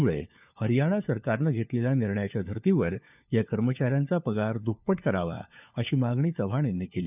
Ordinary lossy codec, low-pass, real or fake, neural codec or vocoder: none; 3.6 kHz; fake; codec, 16 kHz, 4 kbps, FunCodec, trained on LibriTTS, 50 frames a second